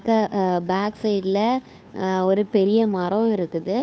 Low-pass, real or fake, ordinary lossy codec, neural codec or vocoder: none; fake; none; codec, 16 kHz, 2 kbps, FunCodec, trained on Chinese and English, 25 frames a second